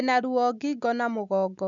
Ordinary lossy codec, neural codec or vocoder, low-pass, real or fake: none; none; 7.2 kHz; real